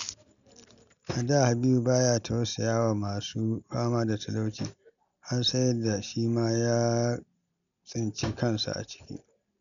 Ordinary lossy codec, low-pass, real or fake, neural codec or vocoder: none; 7.2 kHz; real; none